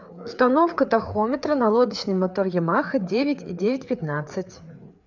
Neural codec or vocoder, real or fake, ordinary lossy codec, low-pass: codec, 16 kHz, 4 kbps, FreqCodec, larger model; fake; none; 7.2 kHz